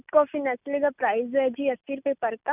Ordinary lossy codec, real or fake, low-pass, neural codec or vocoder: none; real; 3.6 kHz; none